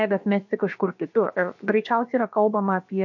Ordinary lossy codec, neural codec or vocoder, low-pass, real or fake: MP3, 48 kbps; codec, 16 kHz, about 1 kbps, DyCAST, with the encoder's durations; 7.2 kHz; fake